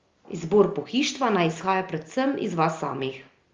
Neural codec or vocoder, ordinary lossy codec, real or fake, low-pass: none; Opus, 32 kbps; real; 7.2 kHz